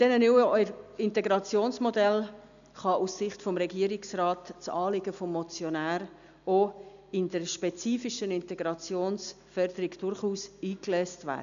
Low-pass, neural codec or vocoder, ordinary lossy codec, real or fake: 7.2 kHz; none; none; real